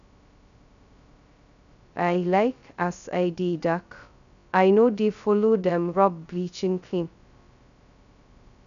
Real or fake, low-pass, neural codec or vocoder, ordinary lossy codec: fake; 7.2 kHz; codec, 16 kHz, 0.2 kbps, FocalCodec; MP3, 96 kbps